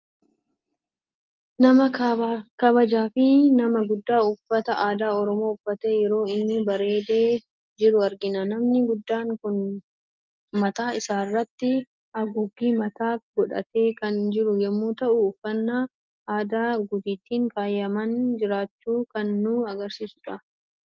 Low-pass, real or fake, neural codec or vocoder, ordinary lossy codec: 7.2 kHz; real; none; Opus, 32 kbps